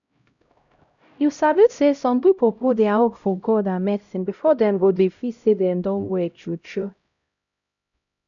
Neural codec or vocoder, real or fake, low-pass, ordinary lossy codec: codec, 16 kHz, 0.5 kbps, X-Codec, HuBERT features, trained on LibriSpeech; fake; 7.2 kHz; none